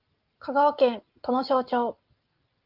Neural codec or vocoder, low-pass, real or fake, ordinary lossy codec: none; 5.4 kHz; real; Opus, 32 kbps